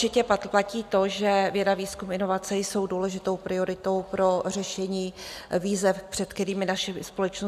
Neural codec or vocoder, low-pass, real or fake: none; 14.4 kHz; real